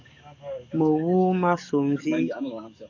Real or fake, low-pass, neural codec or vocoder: fake; 7.2 kHz; codec, 44.1 kHz, 7.8 kbps, DAC